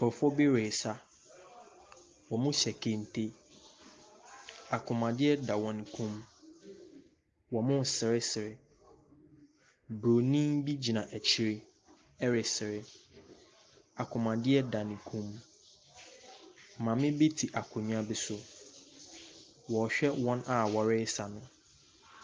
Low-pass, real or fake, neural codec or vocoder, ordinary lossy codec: 7.2 kHz; real; none; Opus, 32 kbps